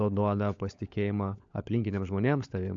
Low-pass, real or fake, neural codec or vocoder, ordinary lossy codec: 7.2 kHz; fake; codec, 16 kHz, 16 kbps, FunCodec, trained on LibriTTS, 50 frames a second; AAC, 64 kbps